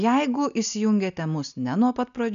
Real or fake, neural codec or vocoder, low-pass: real; none; 7.2 kHz